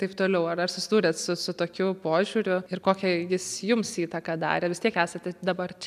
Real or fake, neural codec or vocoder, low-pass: real; none; 14.4 kHz